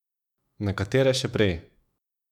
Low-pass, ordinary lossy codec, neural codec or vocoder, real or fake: 19.8 kHz; none; autoencoder, 48 kHz, 128 numbers a frame, DAC-VAE, trained on Japanese speech; fake